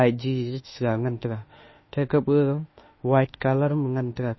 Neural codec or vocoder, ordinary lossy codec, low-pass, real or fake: autoencoder, 48 kHz, 32 numbers a frame, DAC-VAE, trained on Japanese speech; MP3, 24 kbps; 7.2 kHz; fake